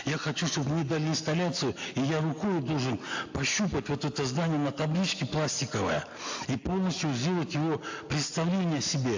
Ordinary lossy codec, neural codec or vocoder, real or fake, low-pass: AAC, 48 kbps; none; real; 7.2 kHz